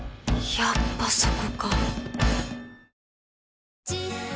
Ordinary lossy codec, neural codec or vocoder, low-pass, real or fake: none; none; none; real